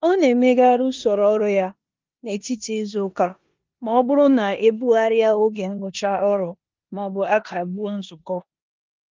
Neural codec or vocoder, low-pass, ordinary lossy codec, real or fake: codec, 16 kHz in and 24 kHz out, 0.9 kbps, LongCat-Audio-Codec, four codebook decoder; 7.2 kHz; Opus, 24 kbps; fake